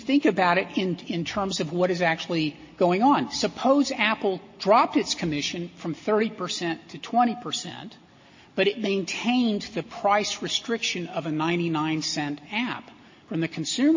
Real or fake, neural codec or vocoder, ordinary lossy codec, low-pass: real; none; MP3, 32 kbps; 7.2 kHz